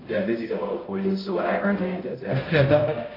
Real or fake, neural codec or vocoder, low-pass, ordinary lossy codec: fake; codec, 16 kHz, 0.5 kbps, X-Codec, HuBERT features, trained on balanced general audio; 5.4 kHz; AAC, 24 kbps